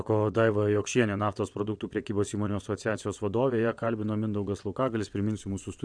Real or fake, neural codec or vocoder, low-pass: fake; vocoder, 22.05 kHz, 80 mel bands, WaveNeXt; 9.9 kHz